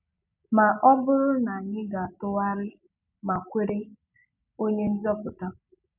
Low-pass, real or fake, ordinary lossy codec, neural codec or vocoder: 3.6 kHz; real; none; none